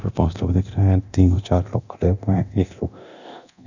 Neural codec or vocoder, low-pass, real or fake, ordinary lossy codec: codec, 24 kHz, 0.9 kbps, DualCodec; 7.2 kHz; fake; none